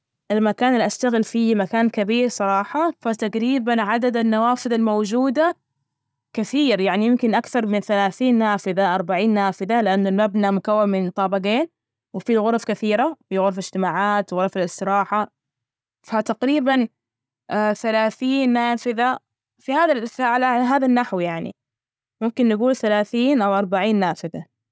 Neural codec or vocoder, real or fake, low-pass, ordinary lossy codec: none; real; none; none